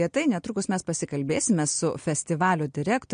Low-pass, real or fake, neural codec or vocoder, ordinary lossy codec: 9.9 kHz; real; none; MP3, 48 kbps